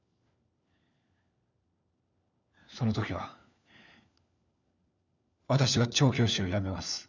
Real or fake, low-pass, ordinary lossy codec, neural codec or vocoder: fake; 7.2 kHz; none; codec, 16 kHz, 4 kbps, FunCodec, trained on LibriTTS, 50 frames a second